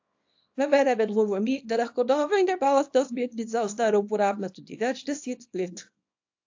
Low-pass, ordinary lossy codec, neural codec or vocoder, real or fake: 7.2 kHz; AAC, 48 kbps; codec, 24 kHz, 0.9 kbps, WavTokenizer, small release; fake